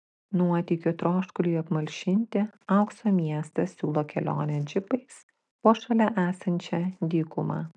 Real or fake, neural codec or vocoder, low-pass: real; none; 10.8 kHz